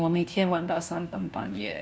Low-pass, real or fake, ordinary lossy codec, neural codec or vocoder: none; fake; none; codec, 16 kHz, 1 kbps, FunCodec, trained on LibriTTS, 50 frames a second